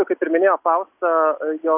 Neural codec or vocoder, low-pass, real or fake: none; 3.6 kHz; real